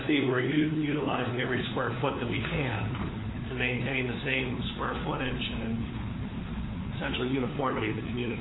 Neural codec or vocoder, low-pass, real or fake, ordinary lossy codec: codec, 16 kHz, 4 kbps, FunCodec, trained on LibriTTS, 50 frames a second; 7.2 kHz; fake; AAC, 16 kbps